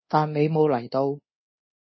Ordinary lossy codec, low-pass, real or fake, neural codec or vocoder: MP3, 24 kbps; 7.2 kHz; fake; codec, 24 kHz, 1.2 kbps, DualCodec